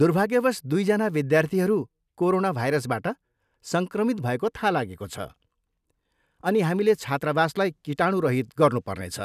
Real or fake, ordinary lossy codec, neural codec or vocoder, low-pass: real; none; none; 14.4 kHz